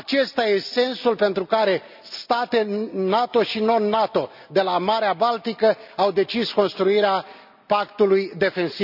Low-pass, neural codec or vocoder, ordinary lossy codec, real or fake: 5.4 kHz; none; none; real